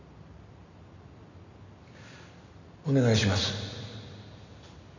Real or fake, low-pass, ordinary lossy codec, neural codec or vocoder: real; 7.2 kHz; none; none